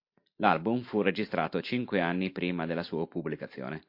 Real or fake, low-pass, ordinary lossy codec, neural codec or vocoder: real; 5.4 kHz; MP3, 32 kbps; none